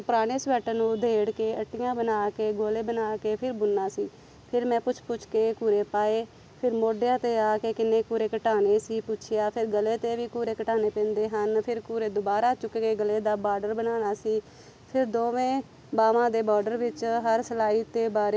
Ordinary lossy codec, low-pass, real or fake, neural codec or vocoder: none; none; real; none